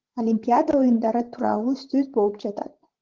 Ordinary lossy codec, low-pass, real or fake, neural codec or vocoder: Opus, 16 kbps; 7.2 kHz; fake; codec, 16 kHz, 16 kbps, FreqCodec, larger model